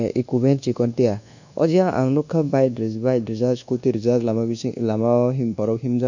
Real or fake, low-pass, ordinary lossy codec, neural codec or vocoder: fake; 7.2 kHz; none; codec, 24 kHz, 1.2 kbps, DualCodec